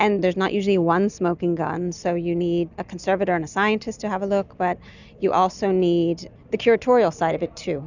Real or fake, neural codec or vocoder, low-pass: real; none; 7.2 kHz